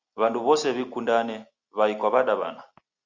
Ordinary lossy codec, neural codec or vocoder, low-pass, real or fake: Opus, 64 kbps; none; 7.2 kHz; real